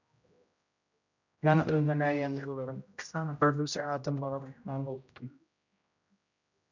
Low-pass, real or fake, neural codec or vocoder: 7.2 kHz; fake; codec, 16 kHz, 0.5 kbps, X-Codec, HuBERT features, trained on general audio